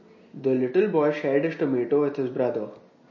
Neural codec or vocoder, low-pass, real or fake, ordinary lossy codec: none; 7.2 kHz; real; MP3, 32 kbps